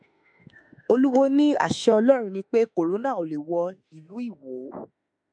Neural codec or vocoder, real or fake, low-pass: autoencoder, 48 kHz, 32 numbers a frame, DAC-VAE, trained on Japanese speech; fake; 9.9 kHz